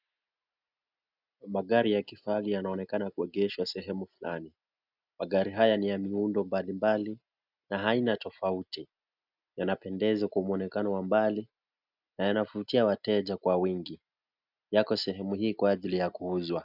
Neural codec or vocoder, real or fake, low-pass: none; real; 5.4 kHz